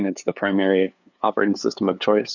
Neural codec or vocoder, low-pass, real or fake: codec, 16 kHz, 2 kbps, FunCodec, trained on LibriTTS, 25 frames a second; 7.2 kHz; fake